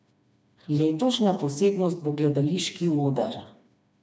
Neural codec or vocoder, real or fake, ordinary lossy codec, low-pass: codec, 16 kHz, 2 kbps, FreqCodec, smaller model; fake; none; none